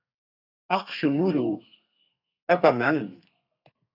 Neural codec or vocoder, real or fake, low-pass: codec, 32 kHz, 1.9 kbps, SNAC; fake; 5.4 kHz